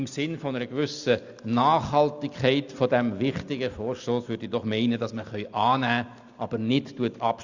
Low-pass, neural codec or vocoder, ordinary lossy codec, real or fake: 7.2 kHz; none; Opus, 64 kbps; real